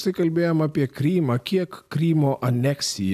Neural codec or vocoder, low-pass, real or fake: none; 14.4 kHz; real